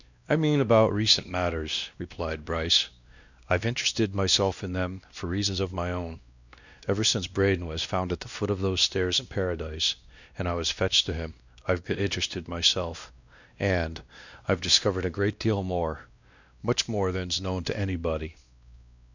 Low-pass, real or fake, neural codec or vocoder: 7.2 kHz; fake; codec, 16 kHz, 1 kbps, X-Codec, WavLM features, trained on Multilingual LibriSpeech